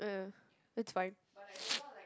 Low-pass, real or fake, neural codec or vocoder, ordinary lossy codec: none; real; none; none